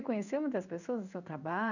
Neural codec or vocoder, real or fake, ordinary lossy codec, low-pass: codec, 16 kHz in and 24 kHz out, 1 kbps, XY-Tokenizer; fake; none; 7.2 kHz